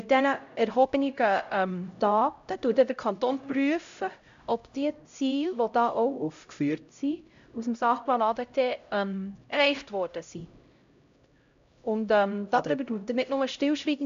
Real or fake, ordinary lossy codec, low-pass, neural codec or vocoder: fake; MP3, 64 kbps; 7.2 kHz; codec, 16 kHz, 0.5 kbps, X-Codec, HuBERT features, trained on LibriSpeech